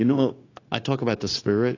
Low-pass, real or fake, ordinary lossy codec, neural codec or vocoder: 7.2 kHz; fake; AAC, 32 kbps; codec, 16 kHz, 0.9 kbps, LongCat-Audio-Codec